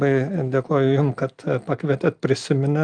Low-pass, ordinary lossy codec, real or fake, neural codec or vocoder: 9.9 kHz; Opus, 32 kbps; real; none